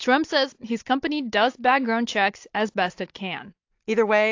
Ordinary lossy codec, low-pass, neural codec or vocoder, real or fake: AAC, 48 kbps; 7.2 kHz; none; real